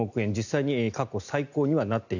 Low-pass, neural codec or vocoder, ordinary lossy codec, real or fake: 7.2 kHz; none; none; real